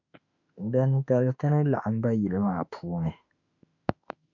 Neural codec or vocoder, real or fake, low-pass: autoencoder, 48 kHz, 32 numbers a frame, DAC-VAE, trained on Japanese speech; fake; 7.2 kHz